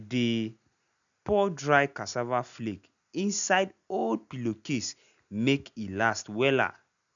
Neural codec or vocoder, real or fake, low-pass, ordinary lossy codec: none; real; 7.2 kHz; none